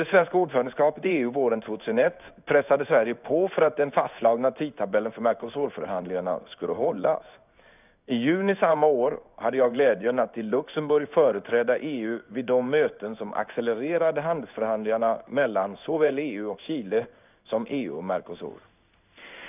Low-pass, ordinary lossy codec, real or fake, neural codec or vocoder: 3.6 kHz; none; fake; codec, 16 kHz in and 24 kHz out, 1 kbps, XY-Tokenizer